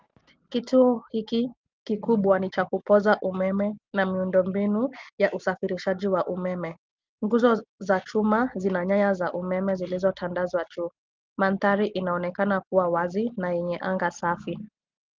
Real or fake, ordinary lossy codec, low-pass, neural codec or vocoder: real; Opus, 24 kbps; 7.2 kHz; none